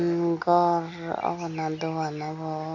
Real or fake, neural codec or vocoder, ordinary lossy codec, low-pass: real; none; none; 7.2 kHz